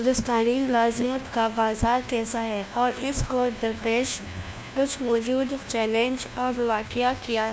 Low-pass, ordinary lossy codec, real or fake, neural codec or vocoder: none; none; fake; codec, 16 kHz, 1 kbps, FunCodec, trained on LibriTTS, 50 frames a second